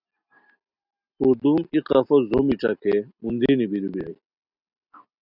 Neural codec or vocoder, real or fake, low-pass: none; real; 5.4 kHz